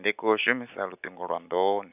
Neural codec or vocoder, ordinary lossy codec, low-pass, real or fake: none; none; 3.6 kHz; real